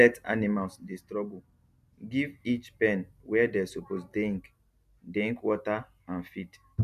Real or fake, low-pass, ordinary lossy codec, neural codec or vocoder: real; 14.4 kHz; none; none